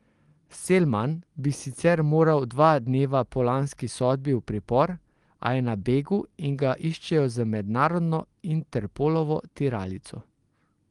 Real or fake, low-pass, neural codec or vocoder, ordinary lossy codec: real; 10.8 kHz; none; Opus, 24 kbps